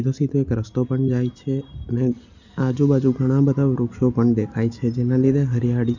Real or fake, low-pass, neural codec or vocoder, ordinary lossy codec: real; 7.2 kHz; none; none